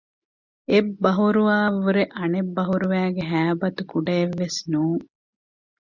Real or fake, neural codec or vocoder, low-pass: real; none; 7.2 kHz